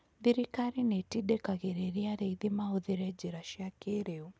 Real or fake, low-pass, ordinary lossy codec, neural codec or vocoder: real; none; none; none